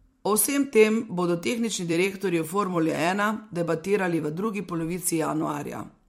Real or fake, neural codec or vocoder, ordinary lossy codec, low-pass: fake; vocoder, 44.1 kHz, 128 mel bands every 256 samples, BigVGAN v2; MP3, 64 kbps; 19.8 kHz